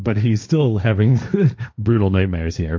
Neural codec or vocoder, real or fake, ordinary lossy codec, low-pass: codec, 16 kHz, 1.1 kbps, Voila-Tokenizer; fake; MP3, 48 kbps; 7.2 kHz